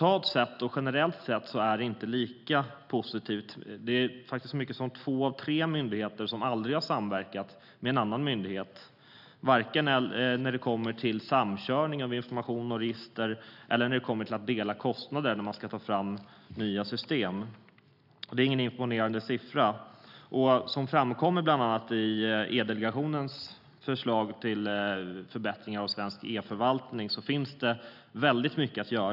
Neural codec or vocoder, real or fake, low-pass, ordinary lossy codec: none; real; 5.4 kHz; none